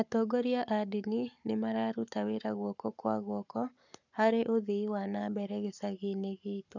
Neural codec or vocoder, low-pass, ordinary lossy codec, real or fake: codec, 44.1 kHz, 7.8 kbps, Pupu-Codec; 7.2 kHz; none; fake